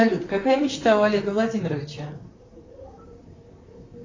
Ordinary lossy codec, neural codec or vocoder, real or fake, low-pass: AAC, 32 kbps; vocoder, 44.1 kHz, 128 mel bands, Pupu-Vocoder; fake; 7.2 kHz